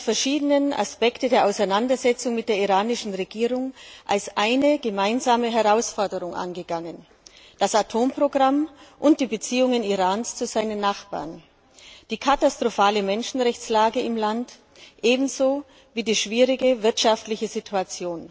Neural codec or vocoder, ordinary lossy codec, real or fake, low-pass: none; none; real; none